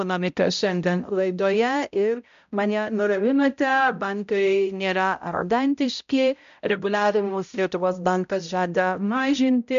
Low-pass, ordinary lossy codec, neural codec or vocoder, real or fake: 7.2 kHz; MP3, 64 kbps; codec, 16 kHz, 0.5 kbps, X-Codec, HuBERT features, trained on balanced general audio; fake